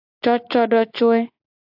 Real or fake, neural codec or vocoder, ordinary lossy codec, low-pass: real; none; AAC, 48 kbps; 5.4 kHz